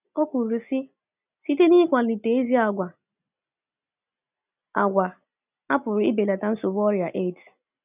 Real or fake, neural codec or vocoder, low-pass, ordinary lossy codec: real; none; 3.6 kHz; none